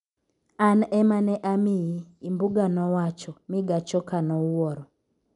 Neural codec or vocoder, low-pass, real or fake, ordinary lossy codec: none; 10.8 kHz; real; none